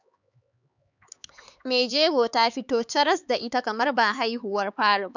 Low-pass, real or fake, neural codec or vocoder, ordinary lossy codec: 7.2 kHz; fake; codec, 16 kHz, 4 kbps, X-Codec, HuBERT features, trained on LibriSpeech; none